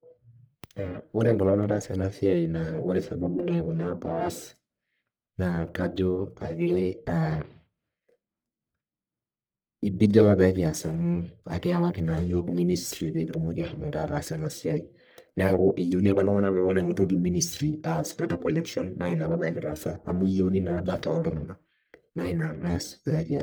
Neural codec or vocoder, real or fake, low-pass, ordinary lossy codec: codec, 44.1 kHz, 1.7 kbps, Pupu-Codec; fake; none; none